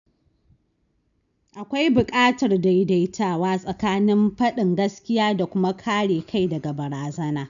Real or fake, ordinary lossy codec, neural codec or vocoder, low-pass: real; none; none; 7.2 kHz